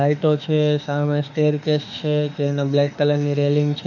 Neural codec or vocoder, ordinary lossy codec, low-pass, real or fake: autoencoder, 48 kHz, 32 numbers a frame, DAC-VAE, trained on Japanese speech; none; 7.2 kHz; fake